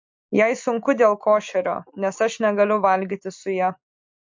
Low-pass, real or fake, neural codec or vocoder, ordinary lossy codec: 7.2 kHz; real; none; MP3, 48 kbps